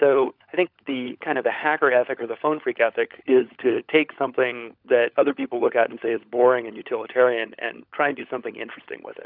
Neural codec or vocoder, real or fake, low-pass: codec, 16 kHz, 16 kbps, FunCodec, trained on LibriTTS, 50 frames a second; fake; 5.4 kHz